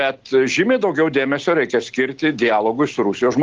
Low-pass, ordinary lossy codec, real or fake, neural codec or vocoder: 7.2 kHz; Opus, 16 kbps; real; none